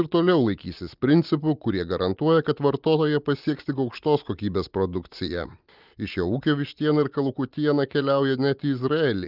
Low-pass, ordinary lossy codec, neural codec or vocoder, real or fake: 5.4 kHz; Opus, 32 kbps; none; real